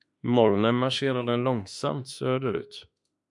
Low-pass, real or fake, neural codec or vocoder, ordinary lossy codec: 10.8 kHz; fake; autoencoder, 48 kHz, 32 numbers a frame, DAC-VAE, trained on Japanese speech; MP3, 96 kbps